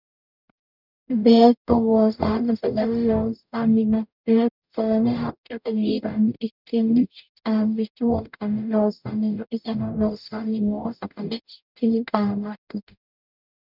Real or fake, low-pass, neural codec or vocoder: fake; 5.4 kHz; codec, 44.1 kHz, 0.9 kbps, DAC